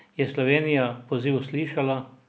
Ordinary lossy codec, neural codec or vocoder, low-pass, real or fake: none; none; none; real